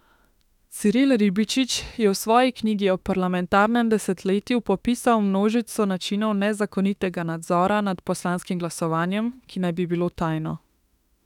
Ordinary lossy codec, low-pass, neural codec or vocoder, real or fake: none; 19.8 kHz; autoencoder, 48 kHz, 32 numbers a frame, DAC-VAE, trained on Japanese speech; fake